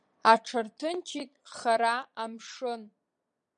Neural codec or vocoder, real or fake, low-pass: vocoder, 22.05 kHz, 80 mel bands, Vocos; fake; 9.9 kHz